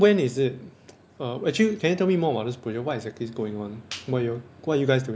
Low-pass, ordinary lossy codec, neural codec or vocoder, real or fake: none; none; none; real